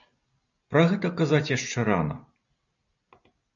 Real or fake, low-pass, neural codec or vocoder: real; 7.2 kHz; none